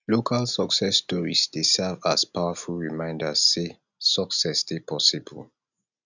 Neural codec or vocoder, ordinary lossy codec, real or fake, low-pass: none; none; real; 7.2 kHz